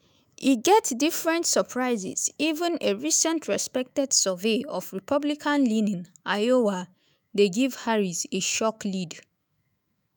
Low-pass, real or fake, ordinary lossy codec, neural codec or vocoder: none; fake; none; autoencoder, 48 kHz, 128 numbers a frame, DAC-VAE, trained on Japanese speech